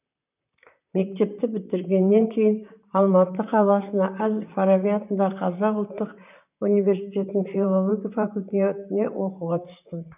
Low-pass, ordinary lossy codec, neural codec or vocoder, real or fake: 3.6 kHz; none; vocoder, 44.1 kHz, 128 mel bands, Pupu-Vocoder; fake